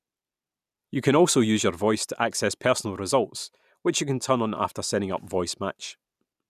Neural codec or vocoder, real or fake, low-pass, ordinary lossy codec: none; real; 14.4 kHz; none